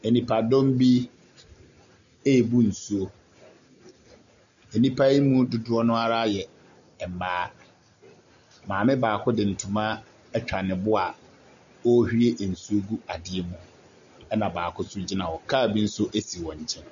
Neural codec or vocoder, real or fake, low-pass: none; real; 7.2 kHz